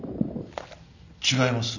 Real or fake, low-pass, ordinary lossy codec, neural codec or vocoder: real; 7.2 kHz; none; none